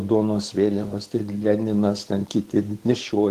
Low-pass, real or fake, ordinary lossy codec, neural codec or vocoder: 14.4 kHz; fake; Opus, 16 kbps; codec, 44.1 kHz, 7.8 kbps, DAC